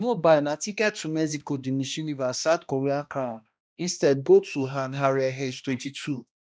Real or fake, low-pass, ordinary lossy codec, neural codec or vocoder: fake; none; none; codec, 16 kHz, 1 kbps, X-Codec, HuBERT features, trained on balanced general audio